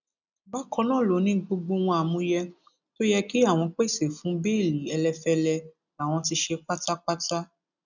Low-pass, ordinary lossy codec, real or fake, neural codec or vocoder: 7.2 kHz; none; real; none